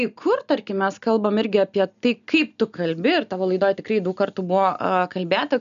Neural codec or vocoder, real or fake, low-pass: none; real; 7.2 kHz